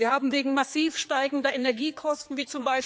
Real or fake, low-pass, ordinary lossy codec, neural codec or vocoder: fake; none; none; codec, 16 kHz, 4 kbps, X-Codec, HuBERT features, trained on general audio